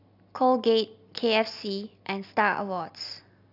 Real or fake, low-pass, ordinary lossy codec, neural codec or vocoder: real; 5.4 kHz; none; none